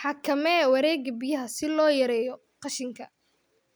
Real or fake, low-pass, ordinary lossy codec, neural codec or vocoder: real; none; none; none